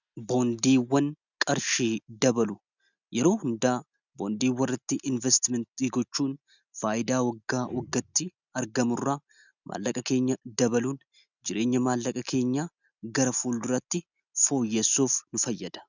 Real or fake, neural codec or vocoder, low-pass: real; none; 7.2 kHz